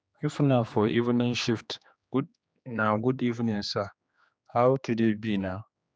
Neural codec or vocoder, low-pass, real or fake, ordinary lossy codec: codec, 16 kHz, 2 kbps, X-Codec, HuBERT features, trained on general audio; none; fake; none